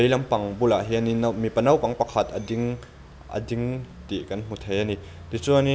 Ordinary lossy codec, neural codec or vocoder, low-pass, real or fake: none; none; none; real